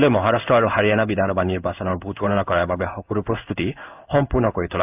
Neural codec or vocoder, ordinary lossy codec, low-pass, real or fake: codec, 16 kHz in and 24 kHz out, 1 kbps, XY-Tokenizer; AAC, 32 kbps; 3.6 kHz; fake